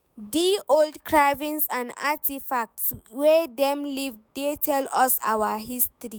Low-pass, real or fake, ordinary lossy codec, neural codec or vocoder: none; fake; none; autoencoder, 48 kHz, 128 numbers a frame, DAC-VAE, trained on Japanese speech